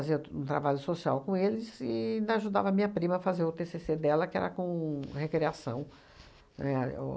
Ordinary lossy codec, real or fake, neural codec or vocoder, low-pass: none; real; none; none